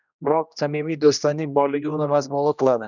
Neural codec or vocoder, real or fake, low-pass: codec, 16 kHz, 1 kbps, X-Codec, HuBERT features, trained on general audio; fake; 7.2 kHz